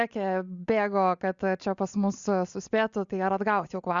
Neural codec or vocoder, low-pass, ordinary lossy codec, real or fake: none; 7.2 kHz; Opus, 64 kbps; real